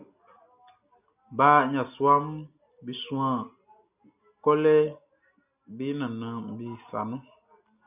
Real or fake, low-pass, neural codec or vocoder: real; 3.6 kHz; none